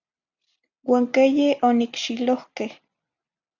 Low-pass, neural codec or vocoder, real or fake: 7.2 kHz; none; real